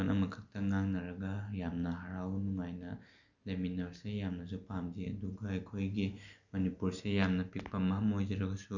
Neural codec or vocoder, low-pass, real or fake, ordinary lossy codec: none; 7.2 kHz; real; none